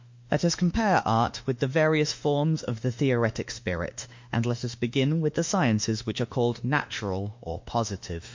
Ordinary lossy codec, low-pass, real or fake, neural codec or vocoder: MP3, 48 kbps; 7.2 kHz; fake; autoencoder, 48 kHz, 32 numbers a frame, DAC-VAE, trained on Japanese speech